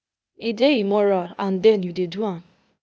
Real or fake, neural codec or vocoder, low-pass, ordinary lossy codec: fake; codec, 16 kHz, 0.8 kbps, ZipCodec; none; none